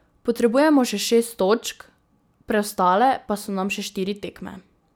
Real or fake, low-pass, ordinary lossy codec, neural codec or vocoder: real; none; none; none